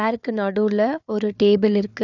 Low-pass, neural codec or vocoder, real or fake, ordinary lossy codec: 7.2 kHz; codec, 16 kHz, 4 kbps, FunCodec, trained on Chinese and English, 50 frames a second; fake; none